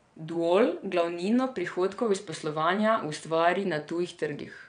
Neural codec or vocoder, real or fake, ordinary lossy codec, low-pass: vocoder, 22.05 kHz, 80 mel bands, WaveNeXt; fake; none; 9.9 kHz